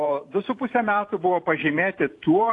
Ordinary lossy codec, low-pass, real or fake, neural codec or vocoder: AAC, 48 kbps; 10.8 kHz; fake; vocoder, 44.1 kHz, 128 mel bands every 512 samples, BigVGAN v2